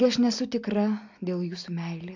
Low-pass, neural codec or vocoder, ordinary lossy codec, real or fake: 7.2 kHz; none; MP3, 64 kbps; real